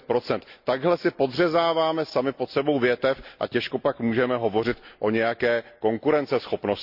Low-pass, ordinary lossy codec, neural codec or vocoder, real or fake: 5.4 kHz; none; none; real